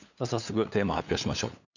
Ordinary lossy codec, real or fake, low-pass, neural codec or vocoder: none; fake; 7.2 kHz; codec, 16 kHz, 8 kbps, FunCodec, trained on LibriTTS, 25 frames a second